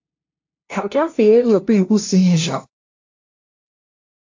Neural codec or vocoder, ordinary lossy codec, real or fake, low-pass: codec, 16 kHz, 0.5 kbps, FunCodec, trained on LibriTTS, 25 frames a second; AAC, 48 kbps; fake; 7.2 kHz